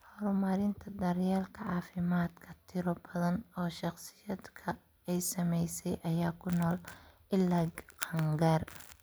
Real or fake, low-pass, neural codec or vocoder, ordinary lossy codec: real; none; none; none